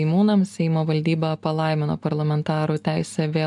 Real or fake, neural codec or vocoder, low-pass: real; none; 10.8 kHz